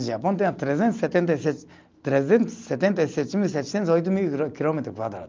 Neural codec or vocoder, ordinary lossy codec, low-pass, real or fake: none; Opus, 24 kbps; 7.2 kHz; real